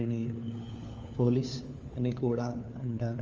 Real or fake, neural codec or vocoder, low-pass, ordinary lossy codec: fake; codec, 16 kHz, 4 kbps, FunCodec, trained on LibriTTS, 50 frames a second; 7.2 kHz; Opus, 32 kbps